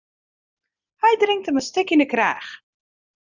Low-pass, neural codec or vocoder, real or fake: 7.2 kHz; none; real